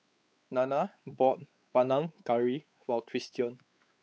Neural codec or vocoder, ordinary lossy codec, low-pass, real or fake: codec, 16 kHz, 4 kbps, X-Codec, WavLM features, trained on Multilingual LibriSpeech; none; none; fake